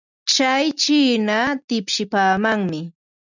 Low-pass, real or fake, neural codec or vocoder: 7.2 kHz; real; none